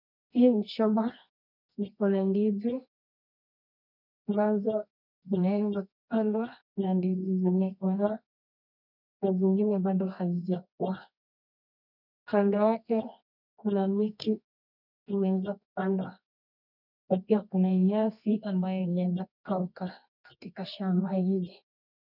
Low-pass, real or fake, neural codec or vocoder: 5.4 kHz; fake; codec, 24 kHz, 0.9 kbps, WavTokenizer, medium music audio release